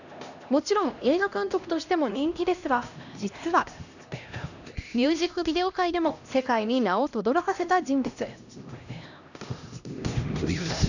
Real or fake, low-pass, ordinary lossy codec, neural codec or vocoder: fake; 7.2 kHz; none; codec, 16 kHz, 1 kbps, X-Codec, HuBERT features, trained on LibriSpeech